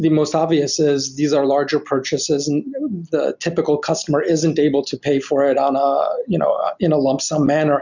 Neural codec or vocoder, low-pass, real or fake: none; 7.2 kHz; real